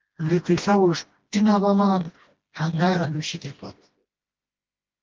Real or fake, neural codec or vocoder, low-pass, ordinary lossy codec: fake; codec, 16 kHz, 1 kbps, FreqCodec, smaller model; 7.2 kHz; Opus, 32 kbps